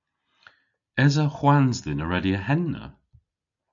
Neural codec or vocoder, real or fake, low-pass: none; real; 7.2 kHz